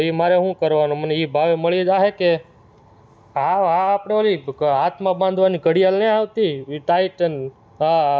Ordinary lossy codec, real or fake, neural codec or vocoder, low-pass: none; real; none; none